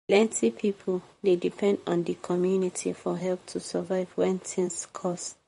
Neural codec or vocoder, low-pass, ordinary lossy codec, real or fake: vocoder, 44.1 kHz, 128 mel bands, Pupu-Vocoder; 19.8 kHz; MP3, 48 kbps; fake